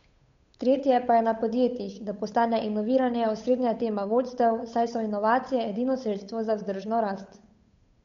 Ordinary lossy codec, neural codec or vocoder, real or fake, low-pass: MP3, 48 kbps; codec, 16 kHz, 8 kbps, FunCodec, trained on Chinese and English, 25 frames a second; fake; 7.2 kHz